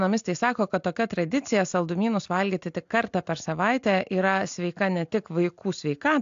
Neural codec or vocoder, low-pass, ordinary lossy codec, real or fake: none; 7.2 kHz; AAC, 64 kbps; real